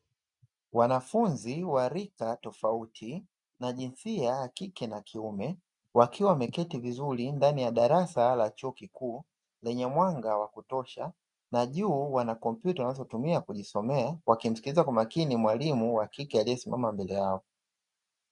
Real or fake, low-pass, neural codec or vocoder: real; 10.8 kHz; none